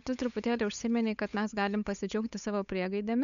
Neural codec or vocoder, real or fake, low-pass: codec, 16 kHz, 8 kbps, FunCodec, trained on Chinese and English, 25 frames a second; fake; 7.2 kHz